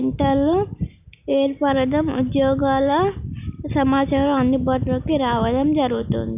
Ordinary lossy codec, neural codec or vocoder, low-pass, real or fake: AAC, 32 kbps; none; 3.6 kHz; real